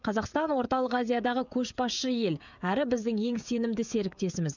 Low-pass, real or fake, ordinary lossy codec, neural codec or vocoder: 7.2 kHz; fake; none; codec, 16 kHz, 16 kbps, FreqCodec, smaller model